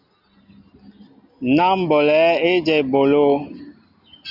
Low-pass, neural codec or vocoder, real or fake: 5.4 kHz; none; real